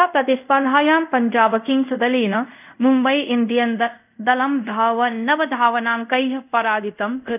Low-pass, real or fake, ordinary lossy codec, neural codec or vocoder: 3.6 kHz; fake; none; codec, 24 kHz, 0.5 kbps, DualCodec